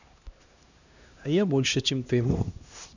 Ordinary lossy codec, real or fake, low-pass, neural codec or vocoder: none; fake; 7.2 kHz; codec, 16 kHz, 1 kbps, X-Codec, HuBERT features, trained on LibriSpeech